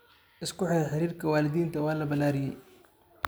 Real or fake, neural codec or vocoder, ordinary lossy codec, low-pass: real; none; none; none